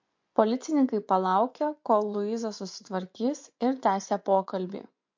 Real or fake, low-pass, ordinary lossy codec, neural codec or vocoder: fake; 7.2 kHz; MP3, 48 kbps; vocoder, 24 kHz, 100 mel bands, Vocos